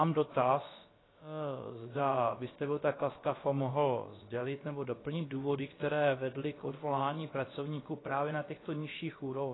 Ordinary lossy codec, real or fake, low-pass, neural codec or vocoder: AAC, 16 kbps; fake; 7.2 kHz; codec, 16 kHz, about 1 kbps, DyCAST, with the encoder's durations